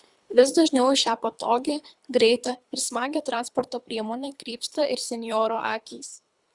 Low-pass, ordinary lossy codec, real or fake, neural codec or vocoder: 10.8 kHz; Opus, 64 kbps; fake; codec, 24 kHz, 3 kbps, HILCodec